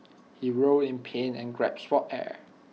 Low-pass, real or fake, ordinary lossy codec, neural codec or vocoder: none; real; none; none